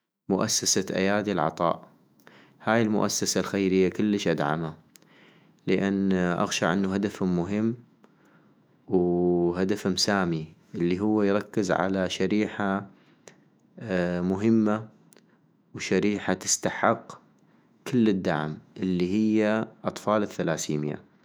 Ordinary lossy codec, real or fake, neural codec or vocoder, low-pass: none; fake; autoencoder, 48 kHz, 128 numbers a frame, DAC-VAE, trained on Japanese speech; none